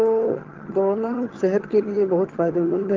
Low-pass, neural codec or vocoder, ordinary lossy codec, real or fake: 7.2 kHz; vocoder, 22.05 kHz, 80 mel bands, HiFi-GAN; Opus, 16 kbps; fake